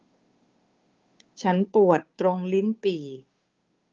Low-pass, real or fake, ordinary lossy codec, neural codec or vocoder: 7.2 kHz; fake; Opus, 32 kbps; codec, 16 kHz, 2 kbps, FunCodec, trained on Chinese and English, 25 frames a second